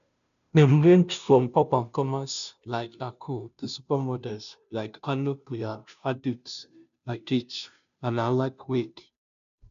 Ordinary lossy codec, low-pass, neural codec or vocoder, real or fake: none; 7.2 kHz; codec, 16 kHz, 0.5 kbps, FunCodec, trained on Chinese and English, 25 frames a second; fake